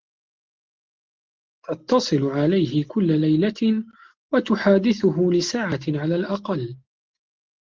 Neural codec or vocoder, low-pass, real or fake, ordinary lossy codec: none; 7.2 kHz; real; Opus, 16 kbps